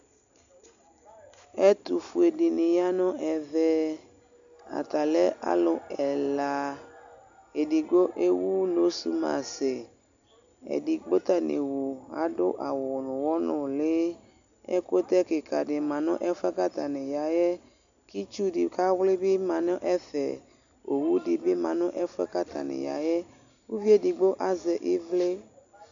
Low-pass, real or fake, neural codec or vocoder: 7.2 kHz; real; none